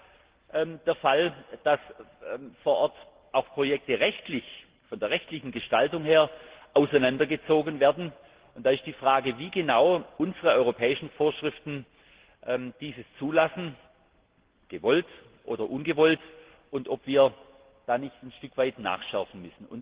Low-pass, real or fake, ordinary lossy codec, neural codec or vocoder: 3.6 kHz; real; Opus, 16 kbps; none